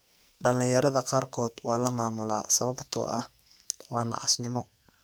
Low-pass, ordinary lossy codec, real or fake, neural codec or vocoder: none; none; fake; codec, 44.1 kHz, 2.6 kbps, SNAC